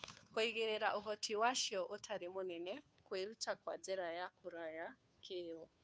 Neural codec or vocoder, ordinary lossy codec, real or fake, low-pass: codec, 16 kHz, 2 kbps, FunCodec, trained on Chinese and English, 25 frames a second; none; fake; none